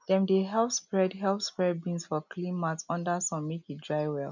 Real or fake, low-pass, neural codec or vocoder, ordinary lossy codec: real; 7.2 kHz; none; none